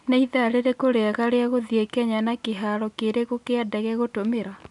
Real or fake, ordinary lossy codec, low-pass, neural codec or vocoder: real; none; 10.8 kHz; none